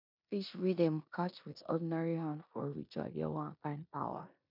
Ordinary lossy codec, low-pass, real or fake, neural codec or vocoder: MP3, 48 kbps; 5.4 kHz; fake; codec, 16 kHz in and 24 kHz out, 0.9 kbps, LongCat-Audio-Codec, four codebook decoder